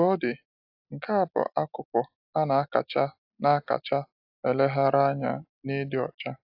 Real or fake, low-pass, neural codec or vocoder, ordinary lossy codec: real; 5.4 kHz; none; AAC, 48 kbps